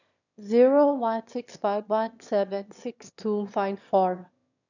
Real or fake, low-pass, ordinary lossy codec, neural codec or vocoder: fake; 7.2 kHz; none; autoencoder, 22.05 kHz, a latent of 192 numbers a frame, VITS, trained on one speaker